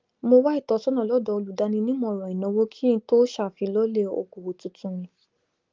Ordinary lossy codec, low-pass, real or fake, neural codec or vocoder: Opus, 32 kbps; 7.2 kHz; real; none